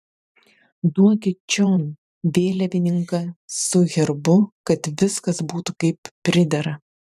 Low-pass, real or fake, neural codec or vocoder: 14.4 kHz; fake; vocoder, 44.1 kHz, 128 mel bands every 512 samples, BigVGAN v2